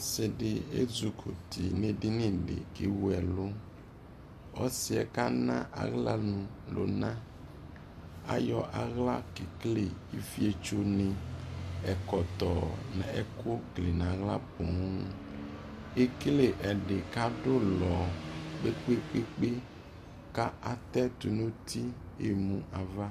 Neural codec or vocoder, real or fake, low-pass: none; real; 14.4 kHz